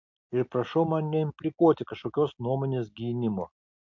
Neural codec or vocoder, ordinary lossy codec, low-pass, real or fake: none; MP3, 48 kbps; 7.2 kHz; real